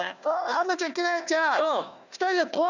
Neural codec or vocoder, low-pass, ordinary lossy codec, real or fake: codec, 16 kHz, 1 kbps, FunCodec, trained on Chinese and English, 50 frames a second; 7.2 kHz; none; fake